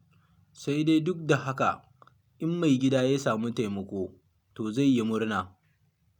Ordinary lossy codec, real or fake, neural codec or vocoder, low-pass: none; real; none; 19.8 kHz